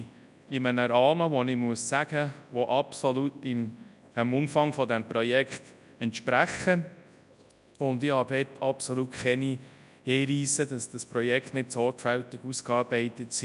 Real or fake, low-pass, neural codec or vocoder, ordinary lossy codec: fake; 10.8 kHz; codec, 24 kHz, 0.9 kbps, WavTokenizer, large speech release; none